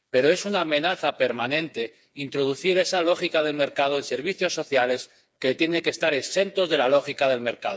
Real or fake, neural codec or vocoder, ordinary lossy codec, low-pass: fake; codec, 16 kHz, 4 kbps, FreqCodec, smaller model; none; none